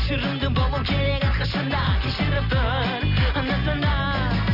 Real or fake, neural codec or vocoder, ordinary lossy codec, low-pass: real; none; none; 5.4 kHz